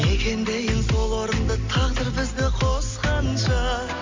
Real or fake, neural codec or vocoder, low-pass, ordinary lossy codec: real; none; 7.2 kHz; MP3, 48 kbps